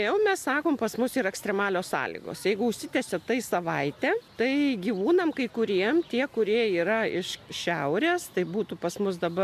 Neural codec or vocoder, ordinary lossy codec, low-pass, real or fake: none; MP3, 96 kbps; 14.4 kHz; real